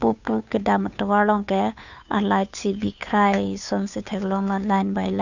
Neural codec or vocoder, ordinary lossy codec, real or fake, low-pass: codec, 16 kHz, 2 kbps, FunCodec, trained on Chinese and English, 25 frames a second; none; fake; 7.2 kHz